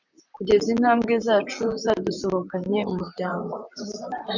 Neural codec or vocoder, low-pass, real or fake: vocoder, 44.1 kHz, 128 mel bands, Pupu-Vocoder; 7.2 kHz; fake